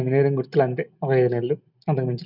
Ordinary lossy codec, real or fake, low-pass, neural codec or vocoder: none; real; 5.4 kHz; none